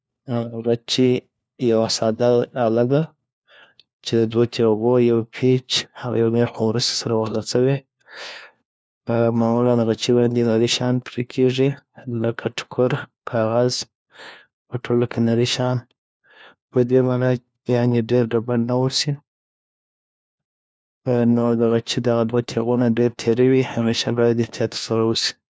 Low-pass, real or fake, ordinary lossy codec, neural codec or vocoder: none; fake; none; codec, 16 kHz, 1 kbps, FunCodec, trained on LibriTTS, 50 frames a second